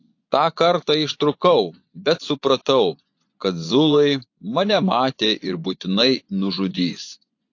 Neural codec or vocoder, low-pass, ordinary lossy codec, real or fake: vocoder, 24 kHz, 100 mel bands, Vocos; 7.2 kHz; AAC, 48 kbps; fake